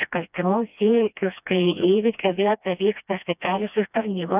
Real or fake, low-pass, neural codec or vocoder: fake; 3.6 kHz; codec, 16 kHz, 1 kbps, FreqCodec, smaller model